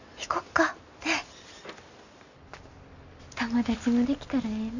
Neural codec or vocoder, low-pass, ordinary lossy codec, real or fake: none; 7.2 kHz; none; real